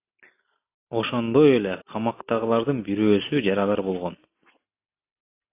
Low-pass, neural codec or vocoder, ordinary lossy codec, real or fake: 3.6 kHz; none; AAC, 24 kbps; real